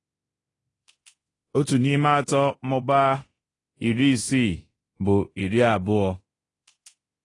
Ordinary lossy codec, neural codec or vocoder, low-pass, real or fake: AAC, 32 kbps; codec, 24 kHz, 0.9 kbps, DualCodec; 10.8 kHz; fake